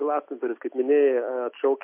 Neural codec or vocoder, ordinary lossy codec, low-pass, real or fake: none; MP3, 32 kbps; 3.6 kHz; real